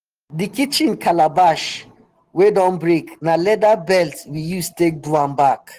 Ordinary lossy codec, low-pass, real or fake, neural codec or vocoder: Opus, 16 kbps; 14.4 kHz; real; none